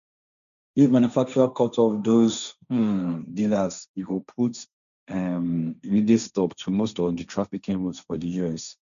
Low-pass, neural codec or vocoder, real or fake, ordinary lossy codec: 7.2 kHz; codec, 16 kHz, 1.1 kbps, Voila-Tokenizer; fake; none